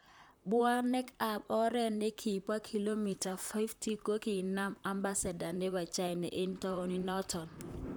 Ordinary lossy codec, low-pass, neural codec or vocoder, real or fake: none; none; vocoder, 44.1 kHz, 128 mel bands, Pupu-Vocoder; fake